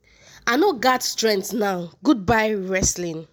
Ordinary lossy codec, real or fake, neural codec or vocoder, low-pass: none; real; none; none